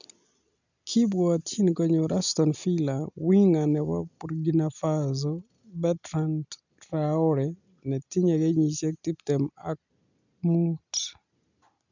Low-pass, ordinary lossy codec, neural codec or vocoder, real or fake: 7.2 kHz; none; none; real